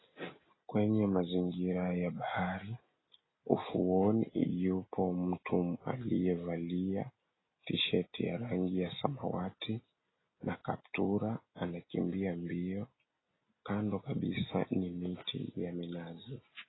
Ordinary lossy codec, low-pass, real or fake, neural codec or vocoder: AAC, 16 kbps; 7.2 kHz; real; none